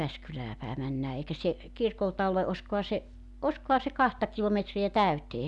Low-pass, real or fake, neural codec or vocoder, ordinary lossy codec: 10.8 kHz; real; none; none